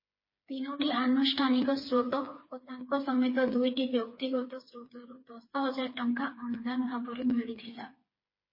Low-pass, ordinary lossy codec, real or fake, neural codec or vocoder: 5.4 kHz; MP3, 24 kbps; fake; codec, 16 kHz, 4 kbps, FreqCodec, smaller model